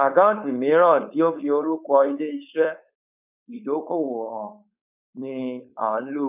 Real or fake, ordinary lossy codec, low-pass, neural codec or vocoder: fake; none; 3.6 kHz; codec, 16 kHz, 4.8 kbps, FACodec